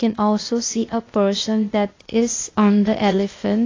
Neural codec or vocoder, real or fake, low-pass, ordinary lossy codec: codec, 16 kHz, 0.8 kbps, ZipCodec; fake; 7.2 kHz; AAC, 32 kbps